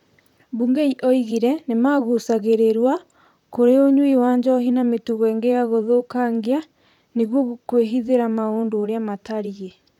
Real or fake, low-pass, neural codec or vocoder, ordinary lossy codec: fake; 19.8 kHz; vocoder, 44.1 kHz, 128 mel bands, Pupu-Vocoder; none